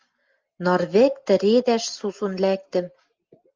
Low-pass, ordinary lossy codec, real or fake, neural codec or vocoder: 7.2 kHz; Opus, 24 kbps; real; none